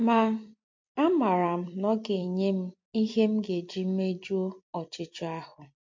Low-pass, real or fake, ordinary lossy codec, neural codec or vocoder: 7.2 kHz; fake; MP3, 48 kbps; vocoder, 44.1 kHz, 128 mel bands every 256 samples, BigVGAN v2